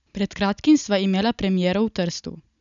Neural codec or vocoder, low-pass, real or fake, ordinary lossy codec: none; 7.2 kHz; real; none